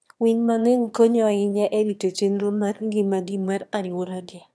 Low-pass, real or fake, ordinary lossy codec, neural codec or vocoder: none; fake; none; autoencoder, 22.05 kHz, a latent of 192 numbers a frame, VITS, trained on one speaker